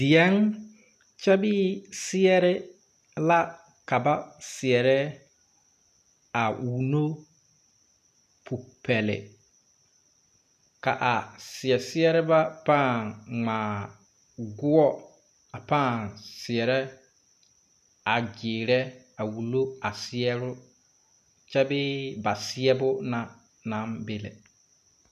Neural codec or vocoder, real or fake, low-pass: none; real; 14.4 kHz